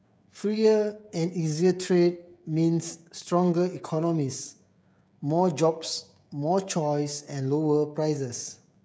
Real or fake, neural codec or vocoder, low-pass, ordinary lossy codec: fake; codec, 16 kHz, 16 kbps, FreqCodec, smaller model; none; none